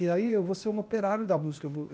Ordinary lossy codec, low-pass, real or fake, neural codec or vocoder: none; none; fake; codec, 16 kHz, 0.8 kbps, ZipCodec